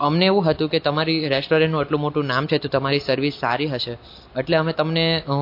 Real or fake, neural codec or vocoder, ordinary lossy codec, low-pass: real; none; MP3, 32 kbps; 5.4 kHz